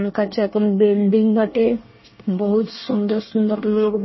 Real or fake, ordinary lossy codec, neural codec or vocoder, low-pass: fake; MP3, 24 kbps; codec, 24 kHz, 1 kbps, SNAC; 7.2 kHz